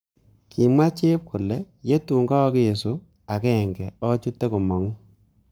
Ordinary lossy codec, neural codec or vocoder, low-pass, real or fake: none; codec, 44.1 kHz, 7.8 kbps, Pupu-Codec; none; fake